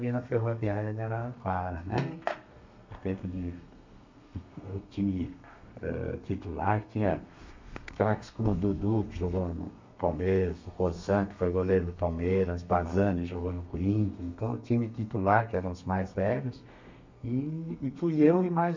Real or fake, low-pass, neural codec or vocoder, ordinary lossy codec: fake; 7.2 kHz; codec, 32 kHz, 1.9 kbps, SNAC; MP3, 64 kbps